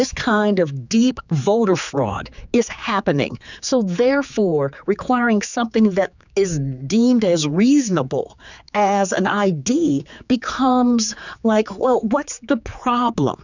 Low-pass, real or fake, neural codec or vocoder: 7.2 kHz; fake; codec, 16 kHz, 4 kbps, X-Codec, HuBERT features, trained on general audio